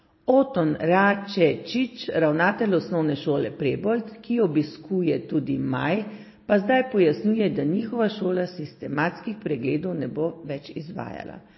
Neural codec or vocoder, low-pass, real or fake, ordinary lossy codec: none; 7.2 kHz; real; MP3, 24 kbps